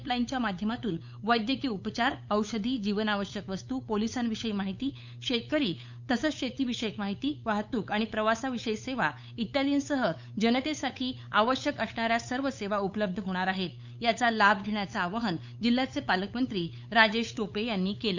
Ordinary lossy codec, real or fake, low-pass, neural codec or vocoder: none; fake; 7.2 kHz; codec, 16 kHz, 8 kbps, FunCodec, trained on LibriTTS, 25 frames a second